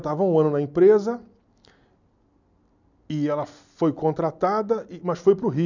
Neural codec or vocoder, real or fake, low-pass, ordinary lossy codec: none; real; 7.2 kHz; none